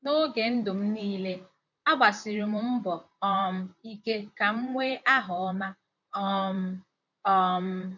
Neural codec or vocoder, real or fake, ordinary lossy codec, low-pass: vocoder, 44.1 kHz, 128 mel bands, Pupu-Vocoder; fake; none; 7.2 kHz